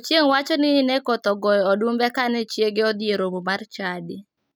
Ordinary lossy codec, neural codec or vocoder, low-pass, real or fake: none; none; none; real